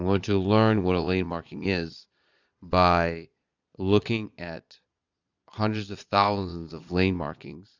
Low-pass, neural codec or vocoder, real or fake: 7.2 kHz; vocoder, 22.05 kHz, 80 mel bands, Vocos; fake